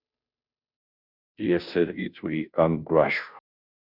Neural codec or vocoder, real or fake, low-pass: codec, 16 kHz, 0.5 kbps, FunCodec, trained on Chinese and English, 25 frames a second; fake; 5.4 kHz